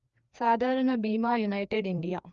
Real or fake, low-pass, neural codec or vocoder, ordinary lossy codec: fake; 7.2 kHz; codec, 16 kHz, 2 kbps, FreqCodec, larger model; Opus, 32 kbps